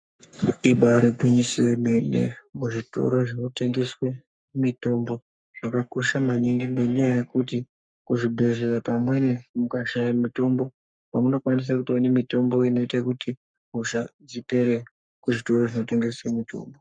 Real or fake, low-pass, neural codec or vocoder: fake; 9.9 kHz; codec, 44.1 kHz, 3.4 kbps, Pupu-Codec